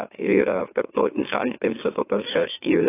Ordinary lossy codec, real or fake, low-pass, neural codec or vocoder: AAC, 16 kbps; fake; 3.6 kHz; autoencoder, 44.1 kHz, a latent of 192 numbers a frame, MeloTTS